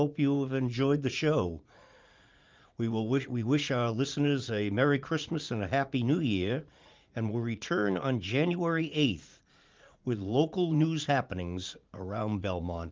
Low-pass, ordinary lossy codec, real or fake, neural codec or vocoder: 7.2 kHz; Opus, 32 kbps; fake; vocoder, 44.1 kHz, 80 mel bands, Vocos